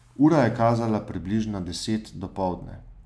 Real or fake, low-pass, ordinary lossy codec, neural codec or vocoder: real; none; none; none